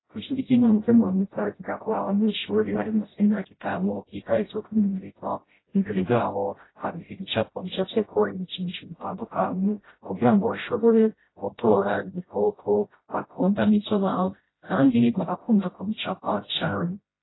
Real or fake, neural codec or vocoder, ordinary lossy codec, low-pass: fake; codec, 16 kHz, 0.5 kbps, FreqCodec, smaller model; AAC, 16 kbps; 7.2 kHz